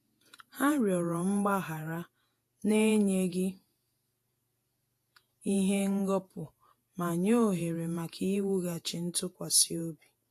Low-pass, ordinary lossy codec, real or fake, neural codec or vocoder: 14.4 kHz; AAC, 64 kbps; fake; vocoder, 48 kHz, 128 mel bands, Vocos